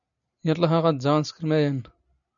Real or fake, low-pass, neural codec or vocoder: real; 7.2 kHz; none